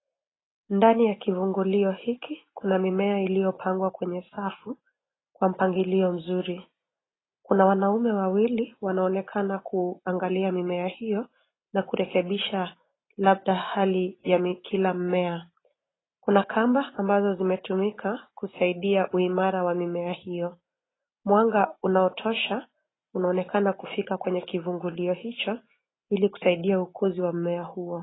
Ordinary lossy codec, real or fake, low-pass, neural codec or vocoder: AAC, 16 kbps; real; 7.2 kHz; none